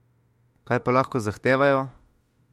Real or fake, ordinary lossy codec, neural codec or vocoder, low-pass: fake; MP3, 64 kbps; autoencoder, 48 kHz, 32 numbers a frame, DAC-VAE, trained on Japanese speech; 19.8 kHz